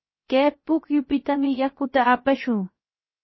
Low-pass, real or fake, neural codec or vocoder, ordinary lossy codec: 7.2 kHz; fake; codec, 16 kHz, 0.7 kbps, FocalCodec; MP3, 24 kbps